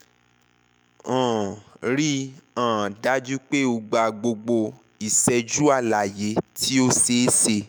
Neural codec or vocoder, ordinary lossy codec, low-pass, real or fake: autoencoder, 48 kHz, 128 numbers a frame, DAC-VAE, trained on Japanese speech; none; none; fake